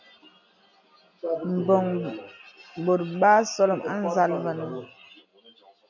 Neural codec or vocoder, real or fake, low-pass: none; real; 7.2 kHz